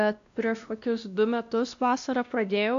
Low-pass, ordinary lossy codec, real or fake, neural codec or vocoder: 7.2 kHz; AAC, 64 kbps; fake; codec, 16 kHz, 1 kbps, X-Codec, WavLM features, trained on Multilingual LibriSpeech